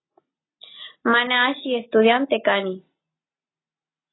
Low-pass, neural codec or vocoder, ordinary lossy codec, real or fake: 7.2 kHz; none; AAC, 16 kbps; real